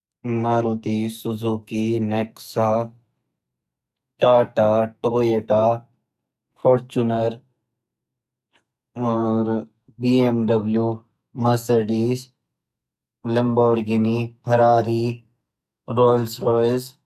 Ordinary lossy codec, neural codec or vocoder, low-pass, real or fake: none; codec, 44.1 kHz, 2.6 kbps, SNAC; 14.4 kHz; fake